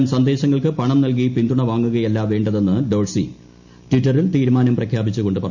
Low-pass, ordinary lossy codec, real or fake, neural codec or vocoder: 7.2 kHz; none; real; none